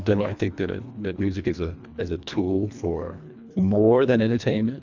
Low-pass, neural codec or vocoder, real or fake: 7.2 kHz; codec, 24 kHz, 1.5 kbps, HILCodec; fake